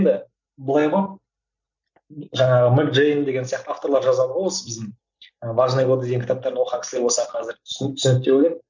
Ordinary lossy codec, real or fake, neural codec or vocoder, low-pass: none; real; none; 7.2 kHz